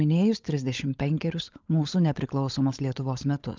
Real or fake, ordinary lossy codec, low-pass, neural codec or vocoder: fake; Opus, 32 kbps; 7.2 kHz; codec, 16 kHz, 16 kbps, FunCodec, trained on LibriTTS, 50 frames a second